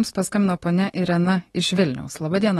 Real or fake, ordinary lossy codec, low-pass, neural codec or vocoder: fake; AAC, 32 kbps; 19.8 kHz; autoencoder, 48 kHz, 128 numbers a frame, DAC-VAE, trained on Japanese speech